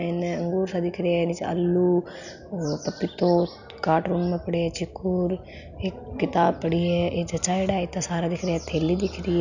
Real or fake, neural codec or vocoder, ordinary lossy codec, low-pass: real; none; none; 7.2 kHz